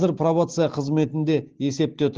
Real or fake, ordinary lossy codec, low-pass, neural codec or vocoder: real; Opus, 16 kbps; 7.2 kHz; none